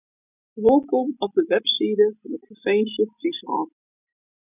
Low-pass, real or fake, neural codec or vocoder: 3.6 kHz; real; none